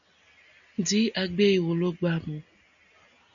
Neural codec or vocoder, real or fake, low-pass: none; real; 7.2 kHz